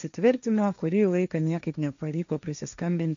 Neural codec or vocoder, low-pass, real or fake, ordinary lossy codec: codec, 16 kHz, 1.1 kbps, Voila-Tokenizer; 7.2 kHz; fake; AAC, 96 kbps